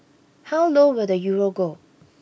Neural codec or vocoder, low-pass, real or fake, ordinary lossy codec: none; none; real; none